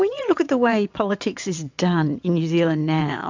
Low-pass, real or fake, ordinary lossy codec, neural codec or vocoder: 7.2 kHz; fake; MP3, 64 kbps; vocoder, 22.05 kHz, 80 mel bands, WaveNeXt